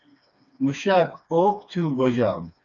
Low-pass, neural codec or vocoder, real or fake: 7.2 kHz; codec, 16 kHz, 2 kbps, FreqCodec, smaller model; fake